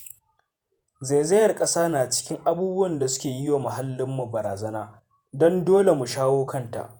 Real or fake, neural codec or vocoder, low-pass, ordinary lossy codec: fake; vocoder, 48 kHz, 128 mel bands, Vocos; none; none